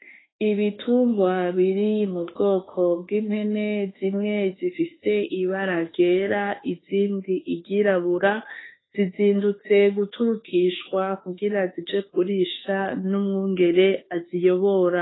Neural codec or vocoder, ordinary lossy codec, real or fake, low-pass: autoencoder, 48 kHz, 32 numbers a frame, DAC-VAE, trained on Japanese speech; AAC, 16 kbps; fake; 7.2 kHz